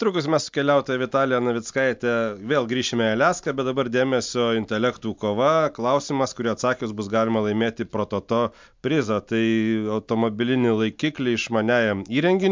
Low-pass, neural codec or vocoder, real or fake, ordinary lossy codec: 7.2 kHz; none; real; MP3, 64 kbps